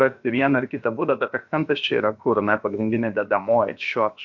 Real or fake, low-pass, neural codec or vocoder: fake; 7.2 kHz; codec, 16 kHz, about 1 kbps, DyCAST, with the encoder's durations